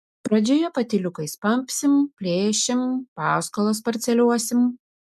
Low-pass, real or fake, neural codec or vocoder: 14.4 kHz; real; none